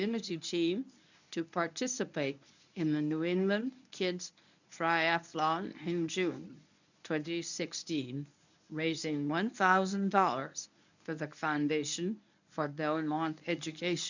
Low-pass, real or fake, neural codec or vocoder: 7.2 kHz; fake; codec, 24 kHz, 0.9 kbps, WavTokenizer, medium speech release version 1